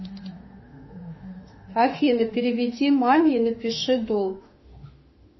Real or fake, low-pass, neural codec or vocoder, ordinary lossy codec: fake; 7.2 kHz; autoencoder, 48 kHz, 32 numbers a frame, DAC-VAE, trained on Japanese speech; MP3, 24 kbps